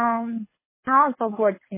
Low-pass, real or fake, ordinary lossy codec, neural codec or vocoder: 3.6 kHz; fake; MP3, 16 kbps; codec, 24 kHz, 6 kbps, HILCodec